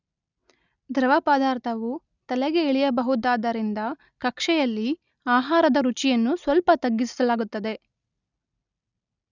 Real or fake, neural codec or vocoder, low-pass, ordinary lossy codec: real; none; 7.2 kHz; none